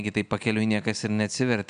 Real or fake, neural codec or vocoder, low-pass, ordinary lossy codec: real; none; 9.9 kHz; MP3, 96 kbps